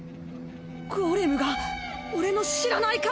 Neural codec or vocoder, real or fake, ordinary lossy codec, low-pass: none; real; none; none